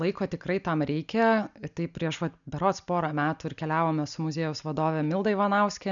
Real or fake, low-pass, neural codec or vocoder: real; 7.2 kHz; none